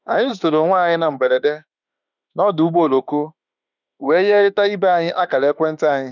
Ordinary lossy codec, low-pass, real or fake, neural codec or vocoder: none; 7.2 kHz; fake; autoencoder, 48 kHz, 32 numbers a frame, DAC-VAE, trained on Japanese speech